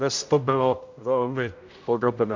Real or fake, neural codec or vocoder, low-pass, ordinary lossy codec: fake; codec, 16 kHz, 0.5 kbps, X-Codec, HuBERT features, trained on general audio; 7.2 kHz; MP3, 64 kbps